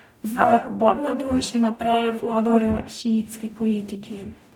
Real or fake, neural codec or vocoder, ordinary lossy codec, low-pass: fake; codec, 44.1 kHz, 0.9 kbps, DAC; none; 19.8 kHz